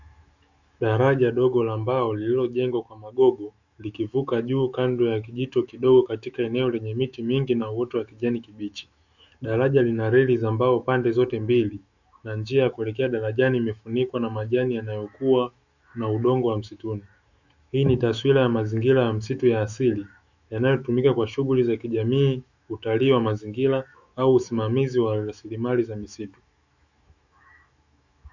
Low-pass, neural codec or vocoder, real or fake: 7.2 kHz; autoencoder, 48 kHz, 128 numbers a frame, DAC-VAE, trained on Japanese speech; fake